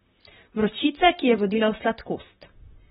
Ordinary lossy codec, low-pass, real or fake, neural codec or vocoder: AAC, 16 kbps; 19.8 kHz; fake; vocoder, 44.1 kHz, 128 mel bands, Pupu-Vocoder